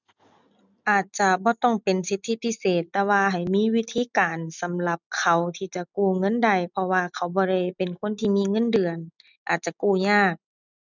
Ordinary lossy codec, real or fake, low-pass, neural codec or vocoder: none; real; 7.2 kHz; none